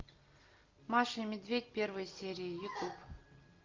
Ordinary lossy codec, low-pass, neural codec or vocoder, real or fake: Opus, 24 kbps; 7.2 kHz; none; real